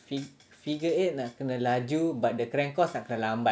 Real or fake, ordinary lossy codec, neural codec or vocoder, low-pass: real; none; none; none